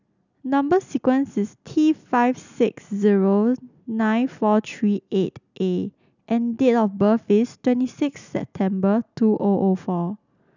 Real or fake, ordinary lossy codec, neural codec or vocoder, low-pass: real; none; none; 7.2 kHz